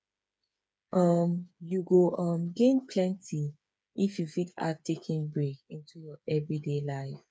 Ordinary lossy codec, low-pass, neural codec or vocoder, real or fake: none; none; codec, 16 kHz, 8 kbps, FreqCodec, smaller model; fake